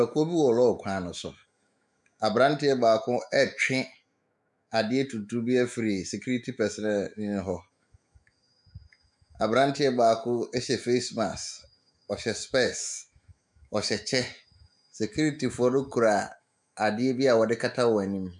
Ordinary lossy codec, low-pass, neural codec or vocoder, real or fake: MP3, 96 kbps; 10.8 kHz; autoencoder, 48 kHz, 128 numbers a frame, DAC-VAE, trained on Japanese speech; fake